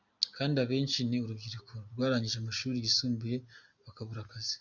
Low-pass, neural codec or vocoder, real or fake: 7.2 kHz; none; real